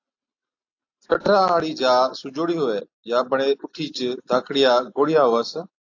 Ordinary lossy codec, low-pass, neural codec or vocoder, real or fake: AAC, 48 kbps; 7.2 kHz; none; real